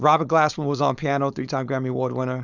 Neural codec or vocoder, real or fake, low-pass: codec, 16 kHz, 4.8 kbps, FACodec; fake; 7.2 kHz